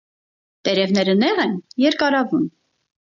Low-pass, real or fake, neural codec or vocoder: 7.2 kHz; real; none